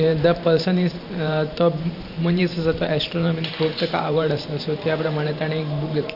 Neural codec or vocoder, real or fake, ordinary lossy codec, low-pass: vocoder, 44.1 kHz, 128 mel bands every 512 samples, BigVGAN v2; fake; none; 5.4 kHz